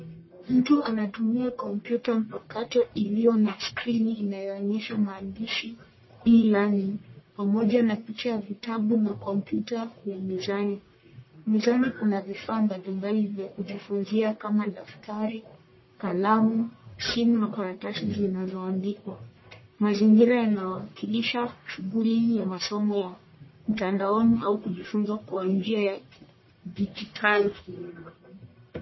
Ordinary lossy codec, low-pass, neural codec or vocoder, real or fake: MP3, 24 kbps; 7.2 kHz; codec, 44.1 kHz, 1.7 kbps, Pupu-Codec; fake